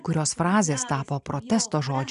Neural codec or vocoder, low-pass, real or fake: none; 10.8 kHz; real